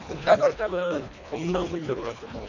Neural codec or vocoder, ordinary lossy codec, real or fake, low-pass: codec, 24 kHz, 1.5 kbps, HILCodec; none; fake; 7.2 kHz